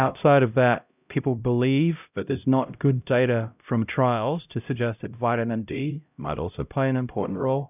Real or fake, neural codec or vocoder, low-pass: fake; codec, 16 kHz, 0.5 kbps, X-Codec, HuBERT features, trained on LibriSpeech; 3.6 kHz